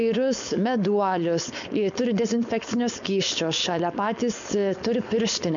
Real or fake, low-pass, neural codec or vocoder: fake; 7.2 kHz; codec, 16 kHz, 4.8 kbps, FACodec